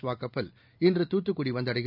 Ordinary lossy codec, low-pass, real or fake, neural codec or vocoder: none; 5.4 kHz; real; none